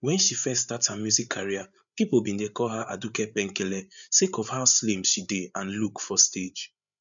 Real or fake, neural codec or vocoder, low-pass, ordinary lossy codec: fake; codec, 16 kHz, 8 kbps, FreqCodec, larger model; 7.2 kHz; none